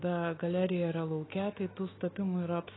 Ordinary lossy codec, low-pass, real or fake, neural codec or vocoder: AAC, 16 kbps; 7.2 kHz; fake; autoencoder, 48 kHz, 128 numbers a frame, DAC-VAE, trained on Japanese speech